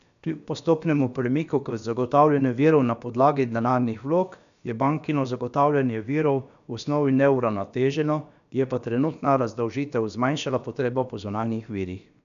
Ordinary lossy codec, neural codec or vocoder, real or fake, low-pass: none; codec, 16 kHz, about 1 kbps, DyCAST, with the encoder's durations; fake; 7.2 kHz